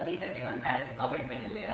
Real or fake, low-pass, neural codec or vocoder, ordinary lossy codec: fake; none; codec, 16 kHz, 8 kbps, FunCodec, trained on LibriTTS, 25 frames a second; none